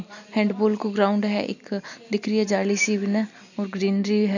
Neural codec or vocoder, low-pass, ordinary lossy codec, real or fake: none; 7.2 kHz; none; real